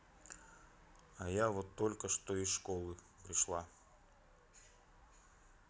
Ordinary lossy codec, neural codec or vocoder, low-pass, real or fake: none; none; none; real